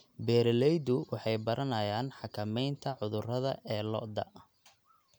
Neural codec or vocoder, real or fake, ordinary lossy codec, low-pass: none; real; none; none